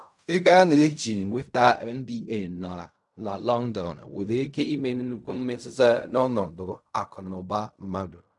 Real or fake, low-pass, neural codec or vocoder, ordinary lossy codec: fake; 10.8 kHz; codec, 16 kHz in and 24 kHz out, 0.4 kbps, LongCat-Audio-Codec, fine tuned four codebook decoder; none